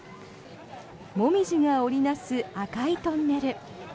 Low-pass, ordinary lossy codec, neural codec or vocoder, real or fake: none; none; none; real